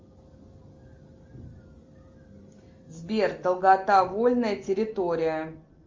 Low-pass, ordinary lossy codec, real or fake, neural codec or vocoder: 7.2 kHz; Opus, 32 kbps; real; none